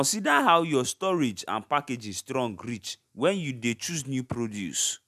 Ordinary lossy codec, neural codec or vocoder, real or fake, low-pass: none; none; real; 14.4 kHz